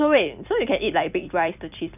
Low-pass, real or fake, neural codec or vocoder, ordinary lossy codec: 3.6 kHz; fake; vocoder, 44.1 kHz, 128 mel bands every 256 samples, BigVGAN v2; none